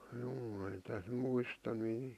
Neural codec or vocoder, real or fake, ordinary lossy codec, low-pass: none; real; none; 14.4 kHz